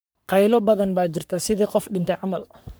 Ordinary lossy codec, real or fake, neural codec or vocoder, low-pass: none; fake; codec, 44.1 kHz, 3.4 kbps, Pupu-Codec; none